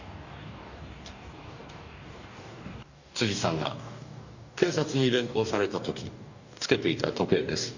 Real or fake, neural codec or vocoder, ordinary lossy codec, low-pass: fake; codec, 44.1 kHz, 2.6 kbps, DAC; none; 7.2 kHz